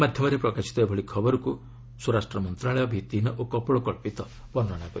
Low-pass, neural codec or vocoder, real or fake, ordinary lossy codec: none; none; real; none